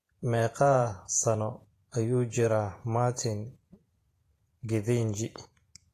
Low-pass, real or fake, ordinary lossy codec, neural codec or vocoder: 14.4 kHz; fake; AAC, 48 kbps; vocoder, 48 kHz, 128 mel bands, Vocos